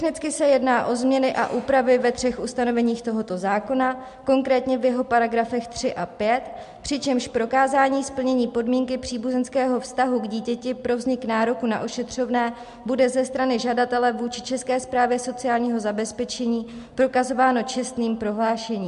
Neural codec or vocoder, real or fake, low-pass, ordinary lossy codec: none; real; 10.8 kHz; MP3, 64 kbps